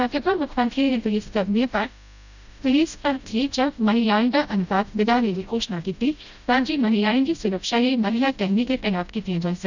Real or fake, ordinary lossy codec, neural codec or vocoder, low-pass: fake; none; codec, 16 kHz, 0.5 kbps, FreqCodec, smaller model; 7.2 kHz